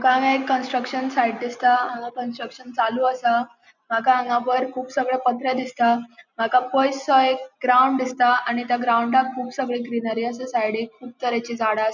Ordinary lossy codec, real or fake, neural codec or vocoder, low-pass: none; real; none; 7.2 kHz